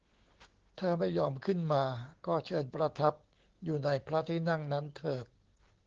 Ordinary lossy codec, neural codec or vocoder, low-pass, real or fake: Opus, 16 kbps; codec, 16 kHz, 6 kbps, DAC; 7.2 kHz; fake